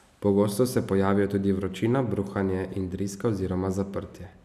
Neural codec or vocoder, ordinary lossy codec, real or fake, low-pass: none; none; real; 14.4 kHz